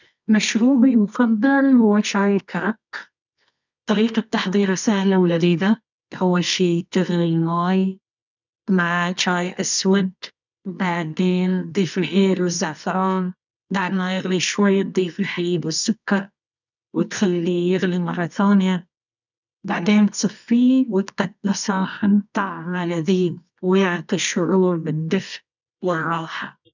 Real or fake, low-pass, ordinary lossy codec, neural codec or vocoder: fake; 7.2 kHz; none; codec, 24 kHz, 0.9 kbps, WavTokenizer, medium music audio release